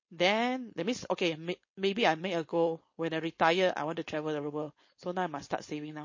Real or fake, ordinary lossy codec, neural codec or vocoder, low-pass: fake; MP3, 32 kbps; codec, 16 kHz, 4.8 kbps, FACodec; 7.2 kHz